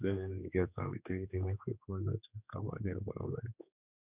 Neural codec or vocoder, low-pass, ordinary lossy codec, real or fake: codec, 16 kHz, 2 kbps, X-Codec, HuBERT features, trained on general audio; 3.6 kHz; none; fake